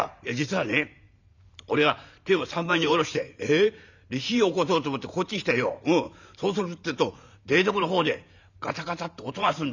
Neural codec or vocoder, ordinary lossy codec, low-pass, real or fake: vocoder, 44.1 kHz, 128 mel bands every 512 samples, BigVGAN v2; none; 7.2 kHz; fake